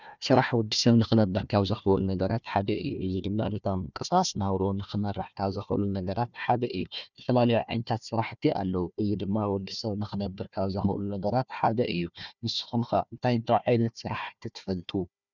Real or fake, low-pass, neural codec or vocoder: fake; 7.2 kHz; codec, 16 kHz, 1 kbps, FunCodec, trained on Chinese and English, 50 frames a second